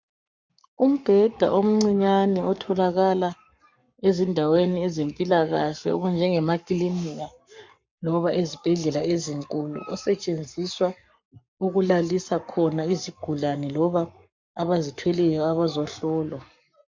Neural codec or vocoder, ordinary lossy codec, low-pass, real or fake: codec, 44.1 kHz, 7.8 kbps, Pupu-Codec; MP3, 64 kbps; 7.2 kHz; fake